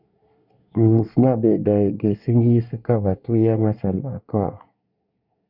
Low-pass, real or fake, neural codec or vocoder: 5.4 kHz; fake; codec, 24 kHz, 1 kbps, SNAC